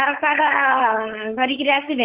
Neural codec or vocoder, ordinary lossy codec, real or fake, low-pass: codec, 16 kHz, 4.8 kbps, FACodec; Opus, 16 kbps; fake; 3.6 kHz